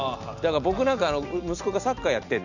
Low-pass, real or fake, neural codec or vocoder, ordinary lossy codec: 7.2 kHz; real; none; none